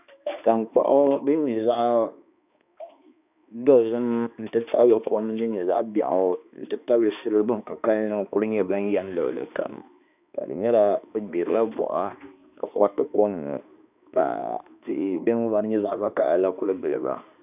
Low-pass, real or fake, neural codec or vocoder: 3.6 kHz; fake; codec, 16 kHz, 2 kbps, X-Codec, HuBERT features, trained on balanced general audio